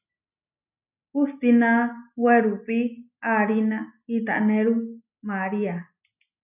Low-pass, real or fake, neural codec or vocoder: 3.6 kHz; real; none